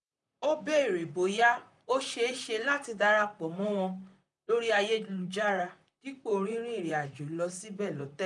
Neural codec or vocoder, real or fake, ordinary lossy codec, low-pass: vocoder, 44.1 kHz, 128 mel bands, Pupu-Vocoder; fake; none; 10.8 kHz